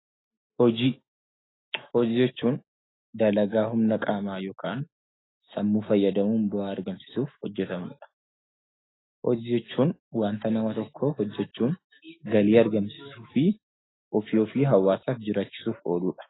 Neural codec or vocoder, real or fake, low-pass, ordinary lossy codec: autoencoder, 48 kHz, 128 numbers a frame, DAC-VAE, trained on Japanese speech; fake; 7.2 kHz; AAC, 16 kbps